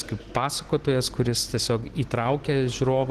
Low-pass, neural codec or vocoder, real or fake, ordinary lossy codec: 14.4 kHz; none; real; Opus, 16 kbps